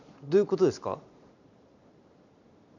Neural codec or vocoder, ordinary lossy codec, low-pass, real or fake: vocoder, 44.1 kHz, 128 mel bands every 256 samples, BigVGAN v2; none; 7.2 kHz; fake